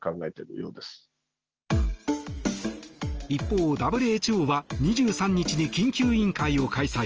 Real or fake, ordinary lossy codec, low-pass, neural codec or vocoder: real; Opus, 24 kbps; 7.2 kHz; none